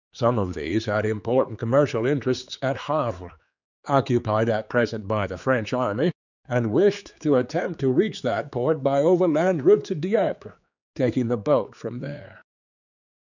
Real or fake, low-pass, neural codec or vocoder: fake; 7.2 kHz; codec, 16 kHz, 4 kbps, X-Codec, HuBERT features, trained on general audio